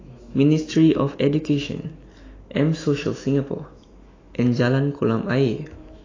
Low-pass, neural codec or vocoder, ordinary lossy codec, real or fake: 7.2 kHz; autoencoder, 48 kHz, 128 numbers a frame, DAC-VAE, trained on Japanese speech; AAC, 32 kbps; fake